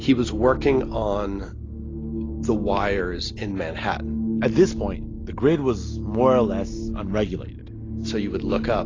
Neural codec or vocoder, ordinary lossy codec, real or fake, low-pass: none; AAC, 32 kbps; real; 7.2 kHz